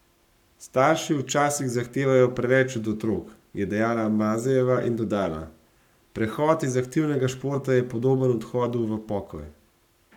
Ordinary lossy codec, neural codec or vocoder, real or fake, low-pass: none; codec, 44.1 kHz, 7.8 kbps, Pupu-Codec; fake; 19.8 kHz